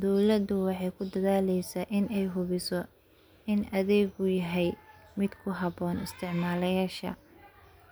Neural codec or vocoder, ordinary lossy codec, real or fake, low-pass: none; none; real; none